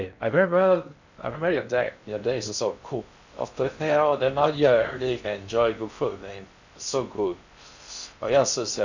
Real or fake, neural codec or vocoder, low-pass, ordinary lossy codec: fake; codec, 16 kHz in and 24 kHz out, 0.6 kbps, FocalCodec, streaming, 2048 codes; 7.2 kHz; none